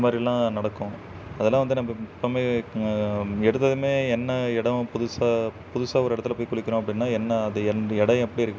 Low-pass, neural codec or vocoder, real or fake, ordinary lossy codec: none; none; real; none